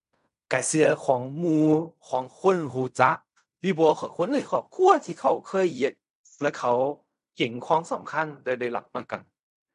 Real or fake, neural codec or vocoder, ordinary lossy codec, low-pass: fake; codec, 16 kHz in and 24 kHz out, 0.4 kbps, LongCat-Audio-Codec, fine tuned four codebook decoder; none; 10.8 kHz